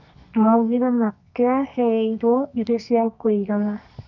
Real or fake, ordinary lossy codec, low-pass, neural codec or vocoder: fake; none; 7.2 kHz; codec, 24 kHz, 0.9 kbps, WavTokenizer, medium music audio release